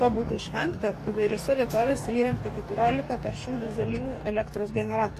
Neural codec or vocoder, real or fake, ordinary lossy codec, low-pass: codec, 44.1 kHz, 2.6 kbps, DAC; fake; AAC, 64 kbps; 14.4 kHz